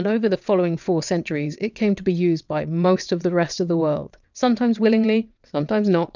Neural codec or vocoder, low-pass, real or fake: vocoder, 22.05 kHz, 80 mel bands, WaveNeXt; 7.2 kHz; fake